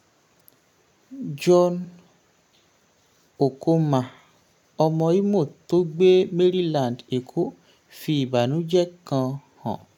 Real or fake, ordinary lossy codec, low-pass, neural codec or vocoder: real; none; 19.8 kHz; none